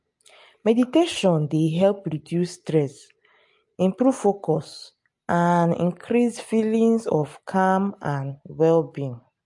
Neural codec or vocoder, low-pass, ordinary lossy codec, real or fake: none; 10.8 kHz; MP3, 48 kbps; real